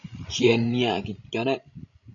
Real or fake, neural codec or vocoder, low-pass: fake; codec, 16 kHz, 16 kbps, FreqCodec, larger model; 7.2 kHz